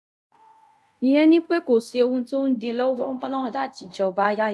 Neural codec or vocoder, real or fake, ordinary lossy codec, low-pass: codec, 24 kHz, 0.5 kbps, DualCodec; fake; none; none